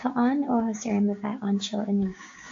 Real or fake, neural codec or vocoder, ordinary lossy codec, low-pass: fake; codec, 16 kHz, 6 kbps, DAC; AAC, 48 kbps; 7.2 kHz